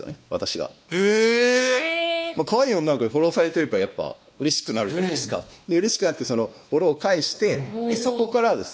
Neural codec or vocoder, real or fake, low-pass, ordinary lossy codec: codec, 16 kHz, 2 kbps, X-Codec, WavLM features, trained on Multilingual LibriSpeech; fake; none; none